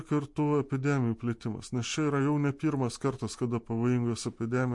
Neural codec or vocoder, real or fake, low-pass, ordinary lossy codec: none; real; 10.8 kHz; MP3, 48 kbps